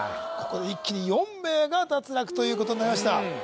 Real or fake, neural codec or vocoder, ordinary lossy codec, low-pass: real; none; none; none